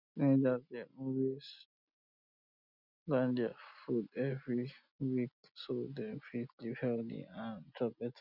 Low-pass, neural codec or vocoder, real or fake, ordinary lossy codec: 5.4 kHz; none; real; none